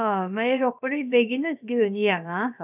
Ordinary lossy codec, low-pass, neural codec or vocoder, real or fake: none; 3.6 kHz; codec, 16 kHz, about 1 kbps, DyCAST, with the encoder's durations; fake